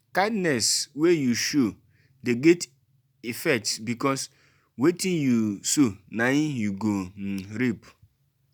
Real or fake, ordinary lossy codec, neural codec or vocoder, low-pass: real; none; none; none